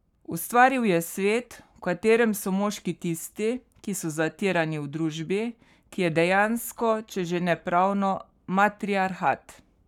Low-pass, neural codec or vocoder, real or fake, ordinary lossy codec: 19.8 kHz; codec, 44.1 kHz, 7.8 kbps, Pupu-Codec; fake; none